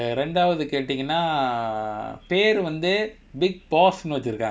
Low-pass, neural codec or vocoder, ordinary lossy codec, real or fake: none; none; none; real